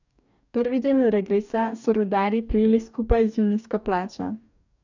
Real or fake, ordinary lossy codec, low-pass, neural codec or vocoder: fake; none; 7.2 kHz; codec, 44.1 kHz, 2.6 kbps, DAC